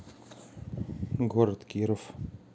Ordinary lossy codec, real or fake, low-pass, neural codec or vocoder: none; real; none; none